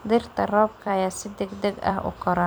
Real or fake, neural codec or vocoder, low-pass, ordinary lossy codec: fake; vocoder, 44.1 kHz, 128 mel bands every 256 samples, BigVGAN v2; none; none